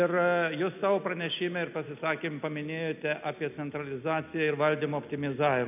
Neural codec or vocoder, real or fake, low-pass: none; real; 3.6 kHz